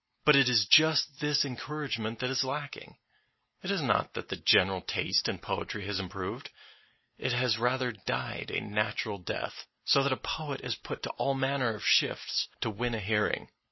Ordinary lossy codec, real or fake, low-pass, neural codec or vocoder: MP3, 24 kbps; real; 7.2 kHz; none